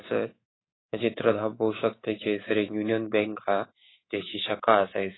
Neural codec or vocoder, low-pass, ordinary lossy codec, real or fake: codec, 24 kHz, 3.1 kbps, DualCodec; 7.2 kHz; AAC, 16 kbps; fake